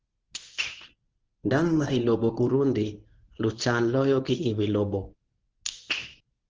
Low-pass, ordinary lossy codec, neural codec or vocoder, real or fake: 7.2 kHz; Opus, 16 kbps; codec, 24 kHz, 0.9 kbps, WavTokenizer, medium speech release version 1; fake